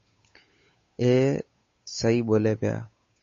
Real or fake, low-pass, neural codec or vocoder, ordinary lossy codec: fake; 7.2 kHz; codec, 16 kHz, 8 kbps, FunCodec, trained on Chinese and English, 25 frames a second; MP3, 32 kbps